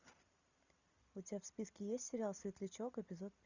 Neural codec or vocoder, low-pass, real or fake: none; 7.2 kHz; real